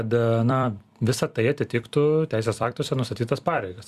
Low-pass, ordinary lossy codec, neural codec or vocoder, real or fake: 14.4 kHz; Opus, 64 kbps; vocoder, 44.1 kHz, 128 mel bands every 256 samples, BigVGAN v2; fake